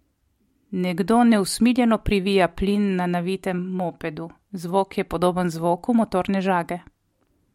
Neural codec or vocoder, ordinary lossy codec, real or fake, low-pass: none; MP3, 64 kbps; real; 19.8 kHz